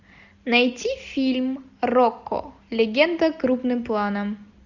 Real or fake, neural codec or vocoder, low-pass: real; none; 7.2 kHz